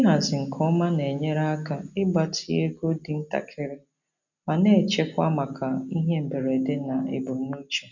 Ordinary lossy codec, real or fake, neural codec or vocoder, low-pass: none; real; none; 7.2 kHz